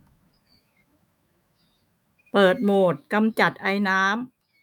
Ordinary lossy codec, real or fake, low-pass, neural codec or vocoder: none; fake; 19.8 kHz; autoencoder, 48 kHz, 128 numbers a frame, DAC-VAE, trained on Japanese speech